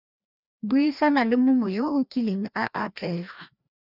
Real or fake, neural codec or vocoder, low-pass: fake; codec, 16 kHz, 1 kbps, FreqCodec, larger model; 5.4 kHz